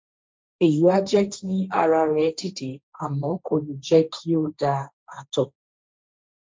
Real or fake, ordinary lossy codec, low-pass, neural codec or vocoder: fake; none; none; codec, 16 kHz, 1.1 kbps, Voila-Tokenizer